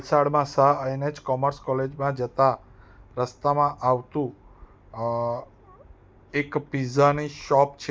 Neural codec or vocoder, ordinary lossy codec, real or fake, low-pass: none; none; real; none